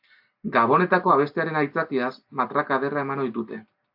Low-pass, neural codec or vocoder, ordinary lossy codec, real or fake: 5.4 kHz; none; AAC, 48 kbps; real